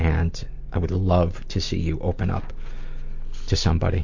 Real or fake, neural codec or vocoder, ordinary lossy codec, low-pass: real; none; MP3, 48 kbps; 7.2 kHz